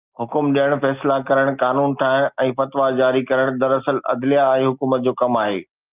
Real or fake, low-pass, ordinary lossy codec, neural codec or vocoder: real; 3.6 kHz; Opus, 24 kbps; none